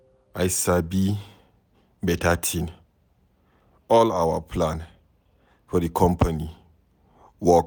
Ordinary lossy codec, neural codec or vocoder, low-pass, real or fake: none; none; none; real